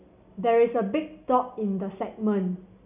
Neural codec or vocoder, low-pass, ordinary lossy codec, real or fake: none; 3.6 kHz; none; real